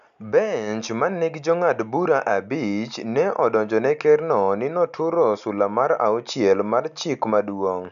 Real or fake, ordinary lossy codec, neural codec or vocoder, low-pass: real; none; none; 7.2 kHz